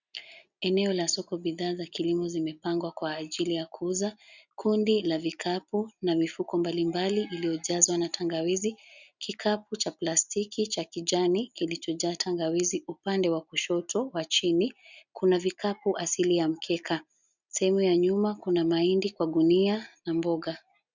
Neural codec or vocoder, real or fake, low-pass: none; real; 7.2 kHz